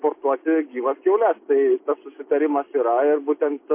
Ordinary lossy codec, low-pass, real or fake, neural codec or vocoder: MP3, 24 kbps; 3.6 kHz; real; none